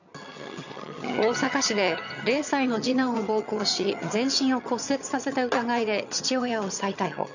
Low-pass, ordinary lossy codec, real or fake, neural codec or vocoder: 7.2 kHz; none; fake; vocoder, 22.05 kHz, 80 mel bands, HiFi-GAN